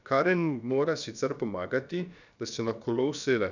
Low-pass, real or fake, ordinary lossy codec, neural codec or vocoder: 7.2 kHz; fake; none; codec, 16 kHz, about 1 kbps, DyCAST, with the encoder's durations